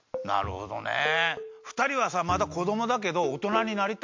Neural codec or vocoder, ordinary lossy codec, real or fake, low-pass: none; MP3, 48 kbps; real; 7.2 kHz